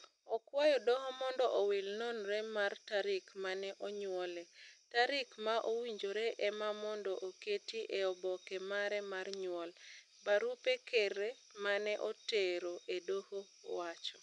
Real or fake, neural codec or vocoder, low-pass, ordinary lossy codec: real; none; 10.8 kHz; none